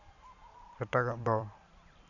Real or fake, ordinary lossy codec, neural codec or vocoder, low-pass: fake; none; vocoder, 44.1 kHz, 128 mel bands every 256 samples, BigVGAN v2; 7.2 kHz